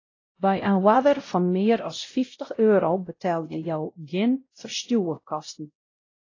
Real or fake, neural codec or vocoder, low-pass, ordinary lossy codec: fake; codec, 16 kHz, 0.5 kbps, X-Codec, WavLM features, trained on Multilingual LibriSpeech; 7.2 kHz; AAC, 32 kbps